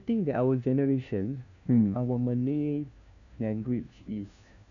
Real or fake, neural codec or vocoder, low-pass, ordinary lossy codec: fake; codec, 16 kHz, 1 kbps, FunCodec, trained on LibriTTS, 50 frames a second; 7.2 kHz; none